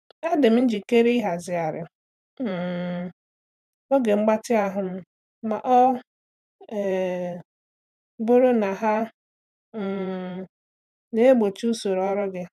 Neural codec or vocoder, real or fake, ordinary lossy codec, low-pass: vocoder, 44.1 kHz, 128 mel bands every 512 samples, BigVGAN v2; fake; none; 14.4 kHz